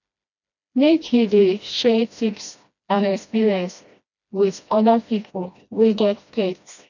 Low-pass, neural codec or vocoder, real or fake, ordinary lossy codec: 7.2 kHz; codec, 16 kHz, 1 kbps, FreqCodec, smaller model; fake; none